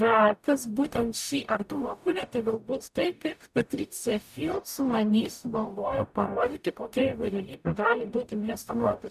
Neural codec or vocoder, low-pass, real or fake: codec, 44.1 kHz, 0.9 kbps, DAC; 14.4 kHz; fake